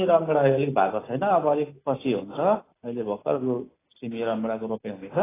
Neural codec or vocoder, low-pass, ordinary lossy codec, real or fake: none; 3.6 kHz; AAC, 16 kbps; real